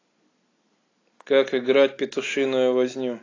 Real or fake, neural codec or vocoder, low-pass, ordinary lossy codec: real; none; 7.2 kHz; AAC, 32 kbps